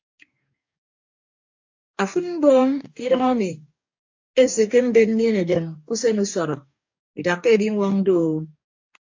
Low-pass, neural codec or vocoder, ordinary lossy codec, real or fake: 7.2 kHz; codec, 44.1 kHz, 2.6 kbps, DAC; AAC, 48 kbps; fake